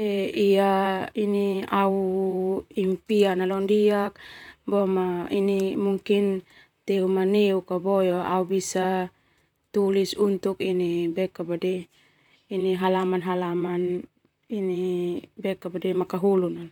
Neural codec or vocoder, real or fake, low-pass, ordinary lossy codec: vocoder, 44.1 kHz, 128 mel bands, Pupu-Vocoder; fake; 19.8 kHz; none